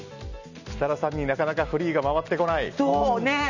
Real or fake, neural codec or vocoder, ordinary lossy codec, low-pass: real; none; none; 7.2 kHz